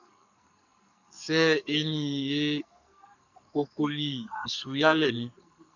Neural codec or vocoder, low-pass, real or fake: codec, 44.1 kHz, 2.6 kbps, SNAC; 7.2 kHz; fake